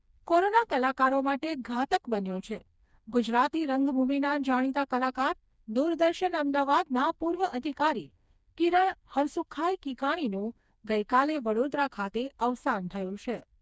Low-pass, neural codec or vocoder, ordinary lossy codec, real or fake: none; codec, 16 kHz, 2 kbps, FreqCodec, smaller model; none; fake